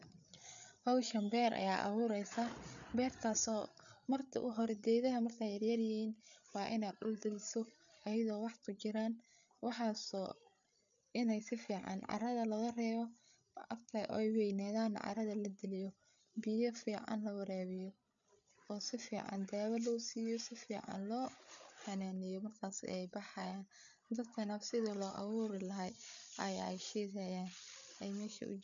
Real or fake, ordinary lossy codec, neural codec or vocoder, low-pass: fake; none; codec, 16 kHz, 8 kbps, FreqCodec, larger model; 7.2 kHz